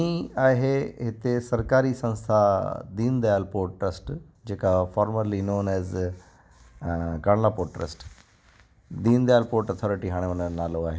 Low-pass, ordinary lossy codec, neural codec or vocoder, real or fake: none; none; none; real